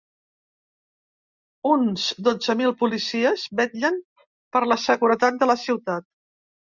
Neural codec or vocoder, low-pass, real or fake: none; 7.2 kHz; real